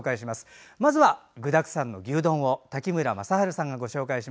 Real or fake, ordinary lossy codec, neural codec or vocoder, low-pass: real; none; none; none